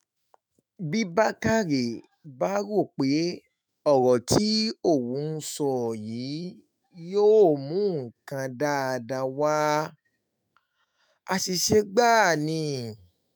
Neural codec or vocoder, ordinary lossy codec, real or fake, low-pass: autoencoder, 48 kHz, 128 numbers a frame, DAC-VAE, trained on Japanese speech; none; fake; none